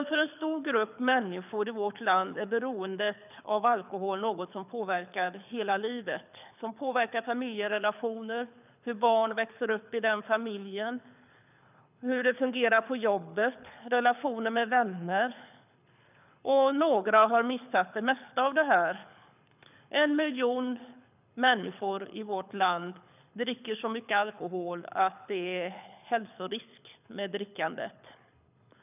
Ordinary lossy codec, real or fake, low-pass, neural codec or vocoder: none; fake; 3.6 kHz; codec, 24 kHz, 6 kbps, HILCodec